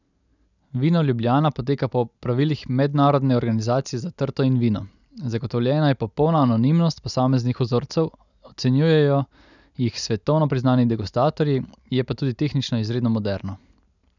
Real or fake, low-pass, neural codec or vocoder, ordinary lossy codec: real; 7.2 kHz; none; none